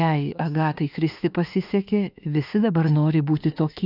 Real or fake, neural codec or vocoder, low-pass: fake; autoencoder, 48 kHz, 32 numbers a frame, DAC-VAE, trained on Japanese speech; 5.4 kHz